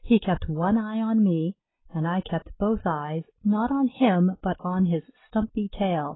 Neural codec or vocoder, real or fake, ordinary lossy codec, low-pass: none; real; AAC, 16 kbps; 7.2 kHz